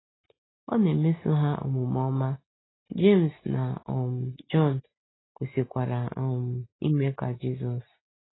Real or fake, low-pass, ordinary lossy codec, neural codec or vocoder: real; 7.2 kHz; AAC, 16 kbps; none